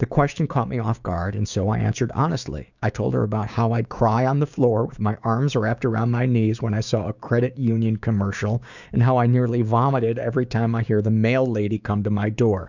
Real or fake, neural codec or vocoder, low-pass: fake; codec, 16 kHz, 6 kbps, DAC; 7.2 kHz